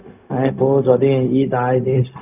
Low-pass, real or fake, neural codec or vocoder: 3.6 kHz; fake; codec, 16 kHz, 0.4 kbps, LongCat-Audio-Codec